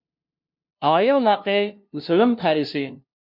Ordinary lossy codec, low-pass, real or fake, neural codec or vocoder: MP3, 48 kbps; 5.4 kHz; fake; codec, 16 kHz, 0.5 kbps, FunCodec, trained on LibriTTS, 25 frames a second